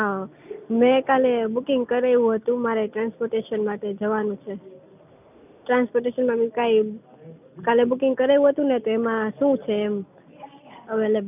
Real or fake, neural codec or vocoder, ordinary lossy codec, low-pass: real; none; none; 3.6 kHz